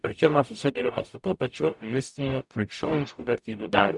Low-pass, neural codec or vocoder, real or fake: 10.8 kHz; codec, 44.1 kHz, 0.9 kbps, DAC; fake